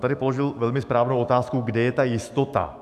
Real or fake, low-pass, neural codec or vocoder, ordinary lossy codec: fake; 14.4 kHz; autoencoder, 48 kHz, 128 numbers a frame, DAC-VAE, trained on Japanese speech; AAC, 96 kbps